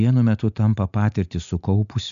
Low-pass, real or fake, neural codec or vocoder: 7.2 kHz; real; none